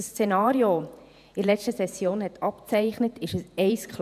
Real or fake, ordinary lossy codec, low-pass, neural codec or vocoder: fake; none; 14.4 kHz; vocoder, 48 kHz, 128 mel bands, Vocos